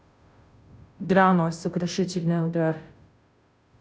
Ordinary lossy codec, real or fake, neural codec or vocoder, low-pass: none; fake; codec, 16 kHz, 0.5 kbps, FunCodec, trained on Chinese and English, 25 frames a second; none